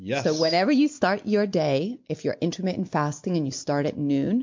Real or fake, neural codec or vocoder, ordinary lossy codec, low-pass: real; none; MP3, 48 kbps; 7.2 kHz